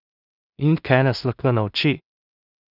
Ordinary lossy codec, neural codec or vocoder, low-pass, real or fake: none; codec, 16 kHz in and 24 kHz out, 0.4 kbps, LongCat-Audio-Codec, two codebook decoder; 5.4 kHz; fake